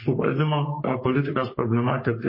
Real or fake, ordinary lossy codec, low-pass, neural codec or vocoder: fake; MP3, 24 kbps; 5.4 kHz; codec, 44.1 kHz, 3.4 kbps, Pupu-Codec